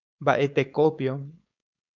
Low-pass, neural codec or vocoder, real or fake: 7.2 kHz; codec, 16 kHz, 4.8 kbps, FACodec; fake